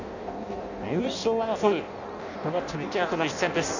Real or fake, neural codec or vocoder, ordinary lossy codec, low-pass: fake; codec, 16 kHz in and 24 kHz out, 0.6 kbps, FireRedTTS-2 codec; none; 7.2 kHz